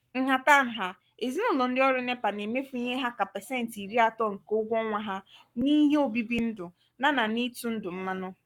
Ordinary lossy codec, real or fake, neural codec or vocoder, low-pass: none; fake; codec, 44.1 kHz, 7.8 kbps, Pupu-Codec; 19.8 kHz